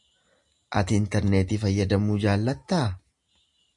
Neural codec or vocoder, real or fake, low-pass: none; real; 10.8 kHz